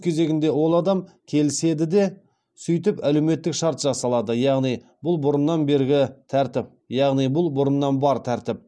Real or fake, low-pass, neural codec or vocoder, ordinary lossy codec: real; none; none; none